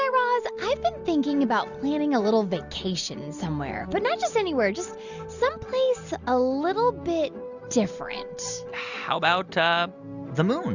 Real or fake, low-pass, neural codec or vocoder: real; 7.2 kHz; none